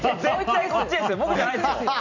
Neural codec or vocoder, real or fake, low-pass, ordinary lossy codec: vocoder, 44.1 kHz, 128 mel bands every 256 samples, BigVGAN v2; fake; 7.2 kHz; none